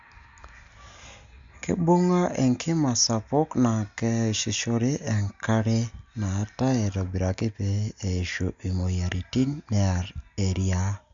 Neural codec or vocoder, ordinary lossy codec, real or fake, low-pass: none; Opus, 64 kbps; real; 7.2 kHz